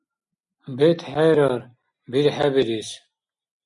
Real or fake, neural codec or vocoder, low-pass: real; none; 10.8 kHz